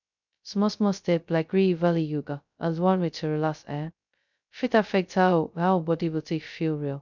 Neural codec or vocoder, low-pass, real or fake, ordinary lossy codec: codec, 16 kHz, 0.2 kbps, FocalCodec; 7.2 kHz; fake; none